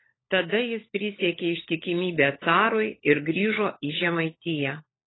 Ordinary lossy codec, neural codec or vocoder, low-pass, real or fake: AAC, 16 kbps; codec, 16 kHz, 16 kbps, FunCodec, trained on LibriTTS, 50 frames a second; 7.2 kHz; fake